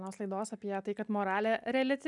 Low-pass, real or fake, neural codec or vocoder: 10.8 kHz; real; none